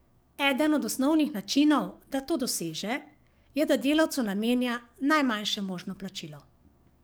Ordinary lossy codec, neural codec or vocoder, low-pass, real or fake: none; codec, 44.1 kHz, 7.8 kbps, DAC; none; fake